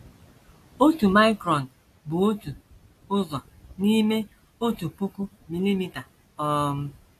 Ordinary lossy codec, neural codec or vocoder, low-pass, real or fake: AAC, 64 kbps; none; 14.4 kHz; real